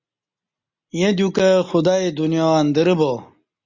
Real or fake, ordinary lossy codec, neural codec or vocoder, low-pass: real; Opus, 64 kbps; none; 7.2 kHz